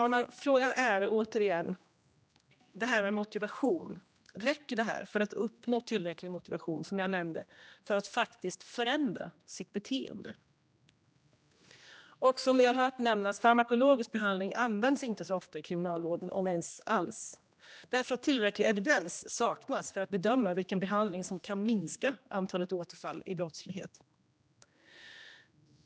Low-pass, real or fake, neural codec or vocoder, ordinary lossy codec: none; fake; codec, 16 kHz, 1 kbps, X-Codec, HuBERT features, trained on general audio; none